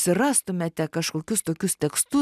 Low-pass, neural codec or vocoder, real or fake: 14.4 kHz; none; real